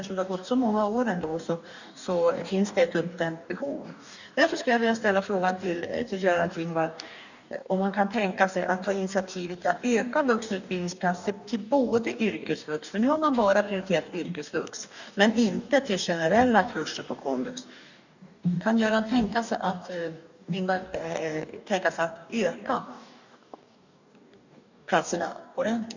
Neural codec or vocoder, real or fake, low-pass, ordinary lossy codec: codec, 44.1 kHz, 2.6 kbps, DAC; fake; 7.2 kHz; none